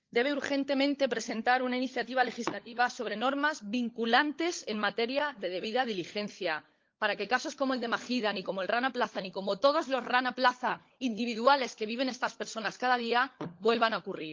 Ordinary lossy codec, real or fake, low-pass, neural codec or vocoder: Opus, 32 kbps; fake; 7.2 kHz; codec, 16 kHz, 16 kbps, FunCodec, trained on LibriTTS, 50 frames a second